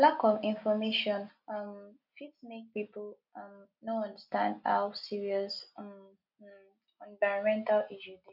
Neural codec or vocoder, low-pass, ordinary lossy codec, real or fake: none; 5.4 kHz; none; real